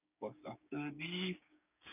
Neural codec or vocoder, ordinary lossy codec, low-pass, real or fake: codec, 16 kHz, 6 kbps, DAC; none; 3.6 kHz; fake